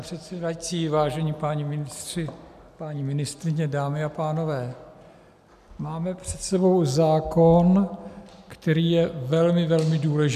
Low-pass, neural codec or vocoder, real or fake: 14.4 kHz; none; real